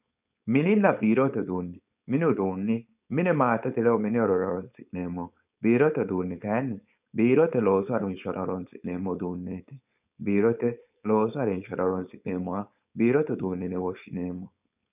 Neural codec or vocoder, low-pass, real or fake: codec, 16 kHz, 4.8 kbps, FACodec; 3.6 kHz; fake